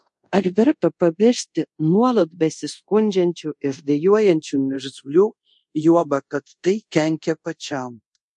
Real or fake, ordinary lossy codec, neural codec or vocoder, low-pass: fake; MP3, 48 kbps; codec, 24 kHz, 0.5 kbps, DualCodec; 10.8 kHz